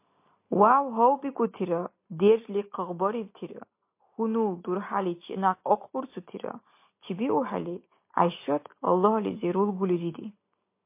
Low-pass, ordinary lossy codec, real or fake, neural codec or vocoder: 3.6 kHz; MP3, 24 kbps; real; none